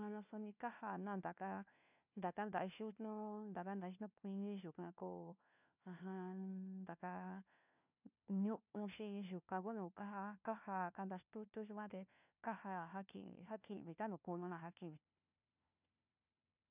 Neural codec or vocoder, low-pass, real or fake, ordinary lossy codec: codec, 16 kHz, 1 kbps, FunCodec, trained on LibriTTS, 50 frames a second; 3.6 kHz; fake; none